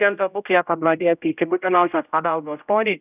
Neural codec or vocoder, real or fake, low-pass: codec, 16 kHz, 0.5 kbps, X-Codec, HuBERT features, trained on general audio; fake; 3.6 kHz